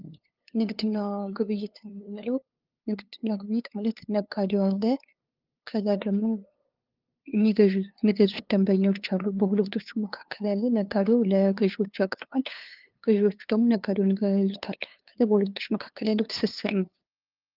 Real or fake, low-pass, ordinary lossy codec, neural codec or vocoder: fake; 5.4 kHz; Opus, 24 kbps; codec, 16 kHz, 2 kbps, FunCodec, trained on LibriTTS, 25 frames a second